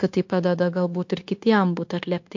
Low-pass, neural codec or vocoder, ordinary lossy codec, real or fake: 7.2 kHz; codec, 16 kHz, 0.9 kbps, LongCat-Audio-Codec; MP3, 48 kbps; fake